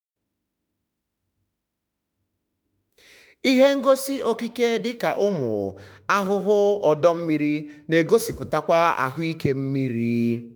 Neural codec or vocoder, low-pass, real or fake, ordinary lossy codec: autoencoder, 48 kHz, 32 numbers a frame, DAC-VAE, trained on Japanese speech; none; fake; none